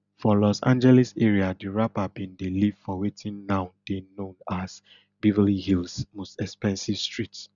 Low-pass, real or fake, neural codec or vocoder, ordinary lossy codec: 7.2 kHz; real; none; none